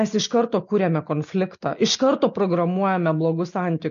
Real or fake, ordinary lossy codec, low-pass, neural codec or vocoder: real; MP3, 48 kbps; 7.2 kHz; none